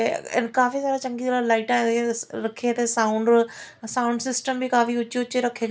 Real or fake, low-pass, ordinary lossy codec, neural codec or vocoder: real; none; none; none